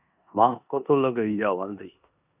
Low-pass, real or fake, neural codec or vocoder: 3.6 kHz; fake; codec, 16 kHz in and 24 kHz out, 0.9 kbps, LongCat-Audio-Codec, four codebook decoder